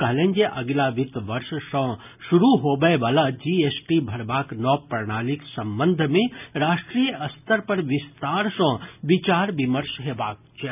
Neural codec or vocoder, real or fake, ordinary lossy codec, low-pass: none; real; none; 3.6 kHz